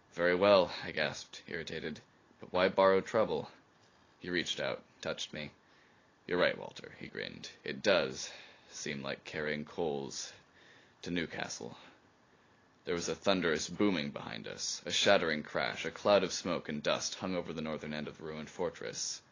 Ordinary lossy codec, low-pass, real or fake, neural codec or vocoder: AAC, 32 kbps; 7.2 kHz; real; none